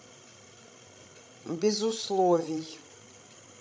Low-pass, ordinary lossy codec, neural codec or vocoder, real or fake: none; none; codec, 16 kHz, 16 kbps, FreqCodec, larger model; fake